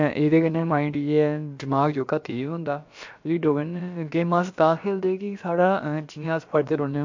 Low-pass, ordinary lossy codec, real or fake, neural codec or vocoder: 7.2 kHz; MP3, 48 kbps; fake; codec, 16 kHz, about 1 kbps, DyCAST, with the encoder's durations